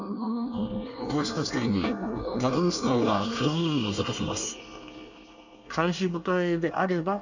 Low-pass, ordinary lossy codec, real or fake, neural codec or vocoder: 7.2 kHz; none; fake; codec, 24 kHz, 1 kbps, SNAC